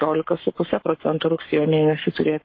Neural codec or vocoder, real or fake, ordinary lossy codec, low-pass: codec, 44.1 kHz, 7.8 kbps, Pupu-Codec; fake; AAC, 32 kbps; 7.2 kHz